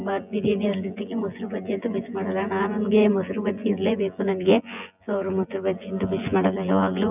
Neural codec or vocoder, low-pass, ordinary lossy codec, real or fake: vocoder, 24 kHz, 100 mel bands, Vocos; 3.6 kHz; none; fake